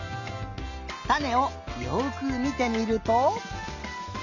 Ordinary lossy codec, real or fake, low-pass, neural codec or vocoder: none; real; 7.2 kHz; none